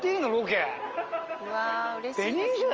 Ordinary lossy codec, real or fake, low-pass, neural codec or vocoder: Opus, 24 kbps; real; 7.2 kHz; none